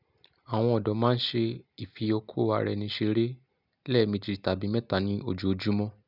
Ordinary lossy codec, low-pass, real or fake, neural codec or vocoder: none; 5.4 kHz; real; none